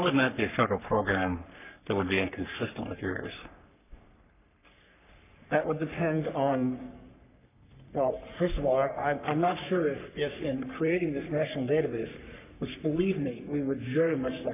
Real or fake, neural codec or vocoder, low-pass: fake; codec, 44.1 kHz, 3.4 kbps, Pupu-Codec; 3.6 kHz